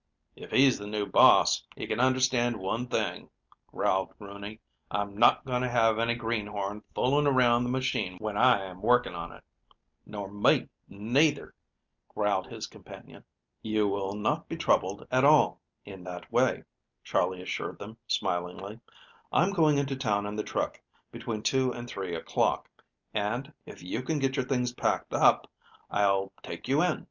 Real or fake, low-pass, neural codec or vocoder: real; 7.2 kHz; none